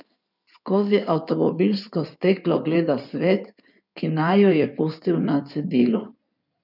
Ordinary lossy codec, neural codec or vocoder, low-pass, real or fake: none; codec, 16 kHz in and 24 kHz out, 2.2 kbps, FireRedTTS-2 codec; 5.4 kHz; fake